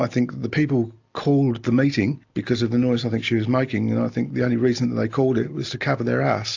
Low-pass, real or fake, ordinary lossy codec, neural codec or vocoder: 7.2 kHz; real; AAC, 48 kbps; none